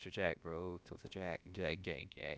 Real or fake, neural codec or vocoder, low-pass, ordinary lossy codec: fake; codec, 16 kHz, about 1 kbps, DyCAST, with the encoder's durations; none; none